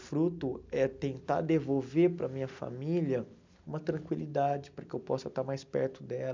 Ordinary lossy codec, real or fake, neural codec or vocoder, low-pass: MP3, 64 kbps; real; none; 7.2 kHz